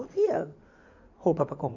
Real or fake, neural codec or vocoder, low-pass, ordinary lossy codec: fake; codec, 16 kHz in and 24 kHz out, 2.2 kbps, FireRedTTS-2 codec; 7.2 kHz; none